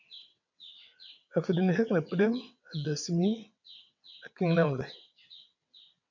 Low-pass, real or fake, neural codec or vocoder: 7.2 kHz; fake; vocoder, 22.05 kHz, 80 mel bands, WaveNeXt